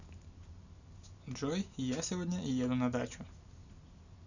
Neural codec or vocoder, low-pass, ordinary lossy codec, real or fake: none; 7.2 kHz; none; real